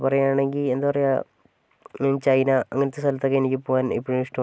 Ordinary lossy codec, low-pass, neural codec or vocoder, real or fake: none; none; none; real